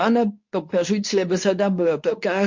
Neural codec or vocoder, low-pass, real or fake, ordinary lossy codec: codec, 24 kHz, 0.9 kbps, WavTokenizer, medium speech release version 2; 7.2 kHz; fake; MP3, 48 kbps